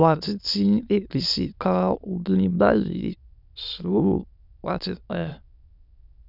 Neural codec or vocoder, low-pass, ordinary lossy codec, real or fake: autoencoder, 22.05 kHz, a latent of 192 numbers a frame, VITS, trained on many speakers; 5.4 kHz; none; fake